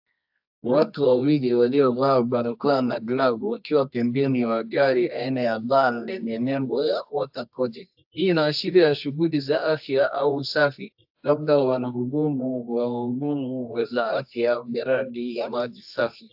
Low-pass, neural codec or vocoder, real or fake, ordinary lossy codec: 5.4 kHz; codec, 24 kHz, 0.9 kbps, WavTokenizer, medium music audio release; fake; AAC, 48 kbps